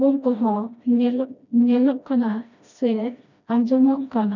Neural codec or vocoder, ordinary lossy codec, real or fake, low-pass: codec, 16 kHz, 1 kbps, FreqCodec, smaller model; none; fake; 7.2 kHz